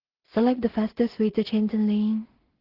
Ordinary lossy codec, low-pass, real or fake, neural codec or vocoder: Opus, 16 kbps; 5.4 kHz; fake; codec, 16 kHz in and 24 kHz out, 0.4 kbps, LongCat-Audio-Codec, two codebook decoder